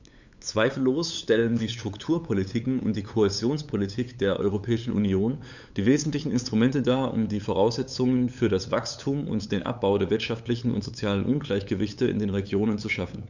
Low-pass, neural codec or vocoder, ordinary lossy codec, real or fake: 7.2 kHz; codec, 16 kHz, 8 kbps, FunCodec, trained on LibriTTS, 25 frames a second; none; fake